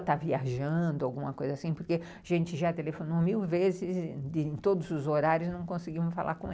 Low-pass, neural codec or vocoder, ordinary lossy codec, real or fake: none; none; none; real